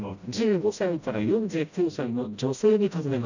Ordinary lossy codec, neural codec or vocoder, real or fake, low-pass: none; codec, 16 kHz, 0.5 kbps, FreqCodec, smaller model; fake; 7.2 kHz